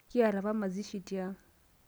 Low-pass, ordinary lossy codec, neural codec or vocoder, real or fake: none; none; none; real